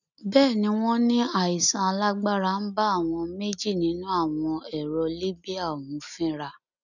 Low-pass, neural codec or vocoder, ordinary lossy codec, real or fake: 7.2 kHz; none; none; real